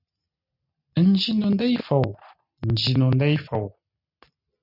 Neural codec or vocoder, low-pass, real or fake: none; 5.4 kHz; real